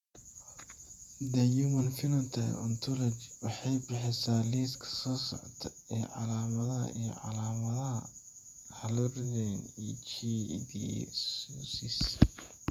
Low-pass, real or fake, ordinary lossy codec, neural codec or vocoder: 19.8 kHz; fake; none; vocoder, 48 kHz, 128 mel bands, Vocos